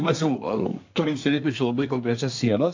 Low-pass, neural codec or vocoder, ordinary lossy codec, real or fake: 7.2 kHz; codec, 24 kHz, 1 kbps, SNAC; AAC, 48 kbps; fake